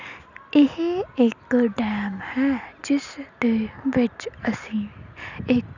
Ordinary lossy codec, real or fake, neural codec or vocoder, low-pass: none; real; none; 7.2 kHz